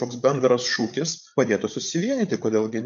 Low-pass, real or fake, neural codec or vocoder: 7.2 kHz; fake; codec, 16 kHz, 8 kbps, FreqCodec, larger model